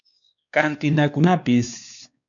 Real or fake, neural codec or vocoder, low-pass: fake; codec, 16 kHz, 2 kbps, X-Codec, WavLM features, trained on Multilingual LibriSpeech; 7.2 kHz